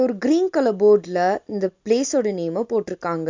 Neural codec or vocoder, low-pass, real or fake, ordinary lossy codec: none; 7.2 kHz; real; MP3, 64 kbps